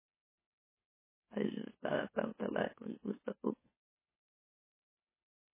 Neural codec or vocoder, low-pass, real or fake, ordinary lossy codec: autoencoder, 44.1 kHz, a latent of 192 numbers a frame, MeloTTS; 3.6 kHz; fake; MP3, 16 kbps